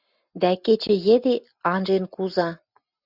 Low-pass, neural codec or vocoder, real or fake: 5.4 kHz; none; real